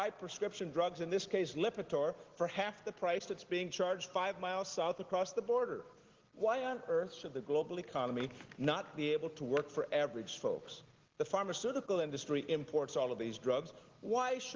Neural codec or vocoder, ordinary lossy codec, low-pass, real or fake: none; Opus, 16 kbps; 7.2 kHz; real